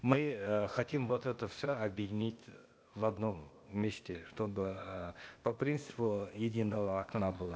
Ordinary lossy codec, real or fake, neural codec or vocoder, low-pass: none; fake; codec, 16 kHz, 0.8 kbps, ZipCodec; none